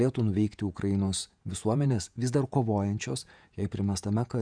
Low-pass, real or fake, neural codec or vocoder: 9.9 kHz; fake; vocoder, 48 kHz, 128 mel bands, Vocos